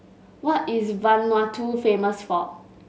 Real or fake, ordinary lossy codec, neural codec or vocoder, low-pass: real; none; none; none